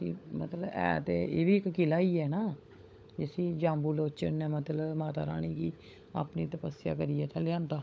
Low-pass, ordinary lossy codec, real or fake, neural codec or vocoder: none; none; fake; codec, 16 kHz, 16 kbps, FunCodec, trained on LibriTTS, 50 frames a second